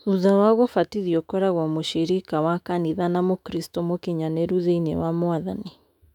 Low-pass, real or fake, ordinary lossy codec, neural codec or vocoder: 19.8 kHz; fake; none; autoencoder, 48 kHz, 128 numbers a frame, DAC-VAE, trained on Japanese speech